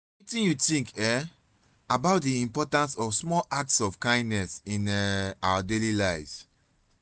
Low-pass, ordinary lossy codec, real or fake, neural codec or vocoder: none; none; real; none